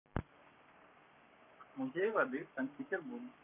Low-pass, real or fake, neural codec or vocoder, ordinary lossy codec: 3.6 kHz; fake; codec, 44.1 kHz, 7.8 kbps, Pupu-Codec; none